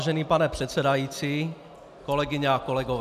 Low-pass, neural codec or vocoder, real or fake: 14.4 kHz; none; real